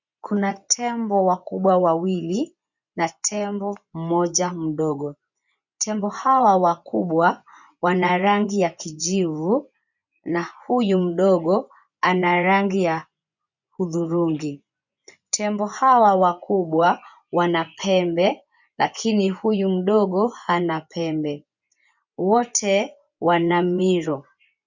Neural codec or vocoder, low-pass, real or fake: vocoder, 24 kHz, 100 mel bands, Vocos; 7.2 kHz; fake